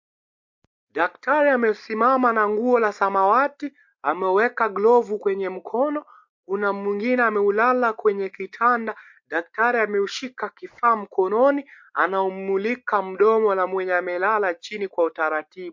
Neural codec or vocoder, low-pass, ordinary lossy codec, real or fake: none; 7.2 kHz; MP3, 48 kbps; real